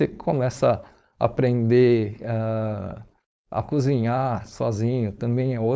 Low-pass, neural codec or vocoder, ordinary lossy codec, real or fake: none; codec, 16 kHz, 4.8 kbps, FACodec; none; fake